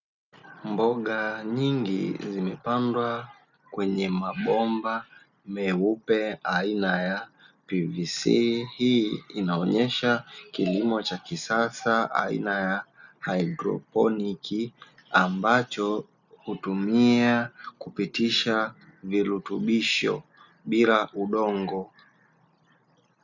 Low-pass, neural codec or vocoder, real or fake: 7.2 kHz; none; real